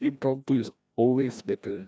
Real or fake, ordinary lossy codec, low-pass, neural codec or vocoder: fake; none; none; codec, 16 kHz, 1 kbps, FreqCodec, larger model